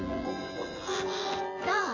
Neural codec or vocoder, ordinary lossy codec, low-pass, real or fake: vocoder, 24 kHz, 100 mel bands, Vocos; AAC, 32 kbps; 7.2 kHz; fake